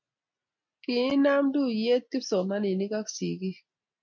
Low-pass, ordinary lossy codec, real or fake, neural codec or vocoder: 7.2 kHz; MP3, 64 kbps; real; none